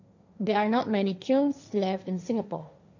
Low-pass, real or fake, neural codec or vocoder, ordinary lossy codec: 7.2 kHz; fake; codec, 16 kHz, 1.1 kbps, Voila-Tokenizer; none